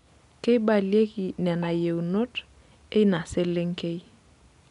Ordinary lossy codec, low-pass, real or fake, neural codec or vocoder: none; 10.8 kHz; real; none